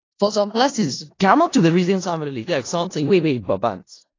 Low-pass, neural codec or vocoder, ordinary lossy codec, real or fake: 7.2 kHz; codec, 16 kHz in and 24 kHz out, 0.4 kbps, LongCat-Audio-Codec, four codebook decoder; AAC, 32 kbps; fake